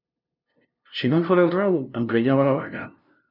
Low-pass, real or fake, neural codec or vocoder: 5.4 kHz; fake; codec, 16 kHz, 0.5 kbps, FunCodec, trained on LibriTTS, 25 frames a second